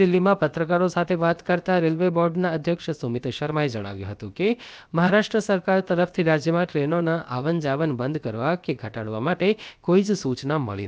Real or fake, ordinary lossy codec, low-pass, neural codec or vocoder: fake; none; none; codec, 16 kHz, about 1 kbps, DyCAST, with the encoder's durations